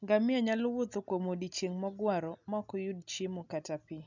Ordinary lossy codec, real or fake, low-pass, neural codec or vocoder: none; real; 7.2 kHz; none